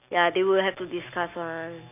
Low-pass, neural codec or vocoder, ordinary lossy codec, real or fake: 3.6 kHz; none; none; real